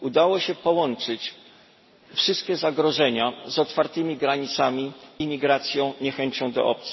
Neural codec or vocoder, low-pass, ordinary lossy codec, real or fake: none; 7.2 kHz; MP3, 24 kbps; real